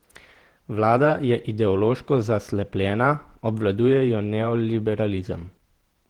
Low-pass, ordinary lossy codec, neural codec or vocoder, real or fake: 19.8 kHz; Opus, 16 kbps; vocoder, 48 kHz, 128 mel bands, Vocos; fake